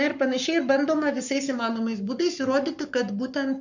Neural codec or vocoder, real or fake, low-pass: codec, 44.1 kHz, 7.8 kbps, Pupu-Codec; fake; 7.2 kHz